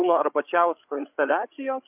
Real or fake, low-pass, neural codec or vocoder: fake; 3.6 kHz; codec, 16 kHz, 8 kbps, FreqCodec, larger model